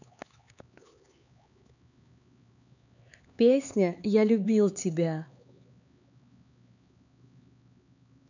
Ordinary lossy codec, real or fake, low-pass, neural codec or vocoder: none; fake; 7.2 kHz; codec, 16 kHz, 4 kbps, X-Codec, HuBERT features, trained on LibriSpeech